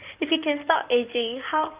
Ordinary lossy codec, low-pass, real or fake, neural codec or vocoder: Opus, 24 kbps; 3.6 kHz; fake; codec, 16 kHz in and 24 kHz out, 2.2 kbps, FireRedTTS-2 codec